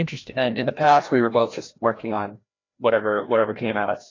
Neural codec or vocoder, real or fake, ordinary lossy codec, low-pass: codec, 16 kHz, 1 kbps, FreqCodec, larger model; fake; AAC, 32 kbps; 7.2 kHz